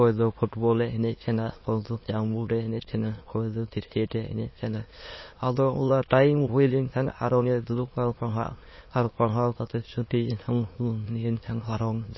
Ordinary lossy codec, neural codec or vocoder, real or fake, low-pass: MP3, 24 kbps; autoencoder, 22.05 kHz, a latent of 192 numbers a frame, VITS, trained on many speakers; fake; 7.2 kHz